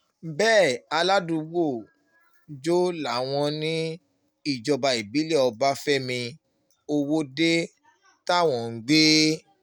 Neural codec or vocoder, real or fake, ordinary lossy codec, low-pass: none; real; none; none